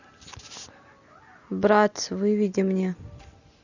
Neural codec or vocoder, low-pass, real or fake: none; 7.2 kHz; real